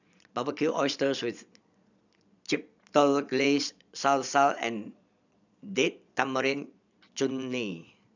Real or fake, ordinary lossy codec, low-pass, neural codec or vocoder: fake; none; 7.2 kHz; vocoder, 22.05 kHz, 80 mel bands, Vocos